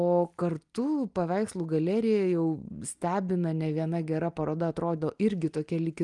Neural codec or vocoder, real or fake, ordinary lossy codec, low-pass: none; real; Opus, 32 kbps; 10.8 kHz